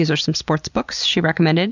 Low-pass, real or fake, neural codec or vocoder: 7.2 kHz; real; none